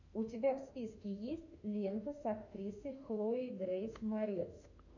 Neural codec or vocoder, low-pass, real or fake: autoencoder, 48 kHz, 32 numbers a frame, DAC-VAE, trained on Japanese speech; 7.2 kHz; fake